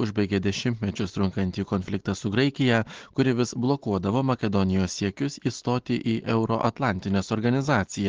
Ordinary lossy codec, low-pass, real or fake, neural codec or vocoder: Opus, 16 kbps; 7.2 kHz; real; none